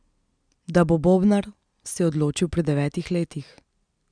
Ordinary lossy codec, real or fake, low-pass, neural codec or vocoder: none; real; 9.9 kHz; none